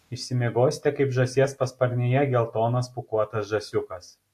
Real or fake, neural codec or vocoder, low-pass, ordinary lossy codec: real; none; 14.4 kHz; AAC, 64 kbps